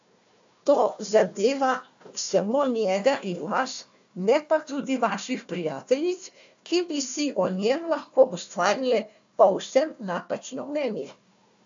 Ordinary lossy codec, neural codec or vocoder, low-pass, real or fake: AAC, 64 kbps; codec, 16 kHz, 1 kbps, FunCodec, trained on Chinese and English, 50 frames a second; 7.2 kHz; fake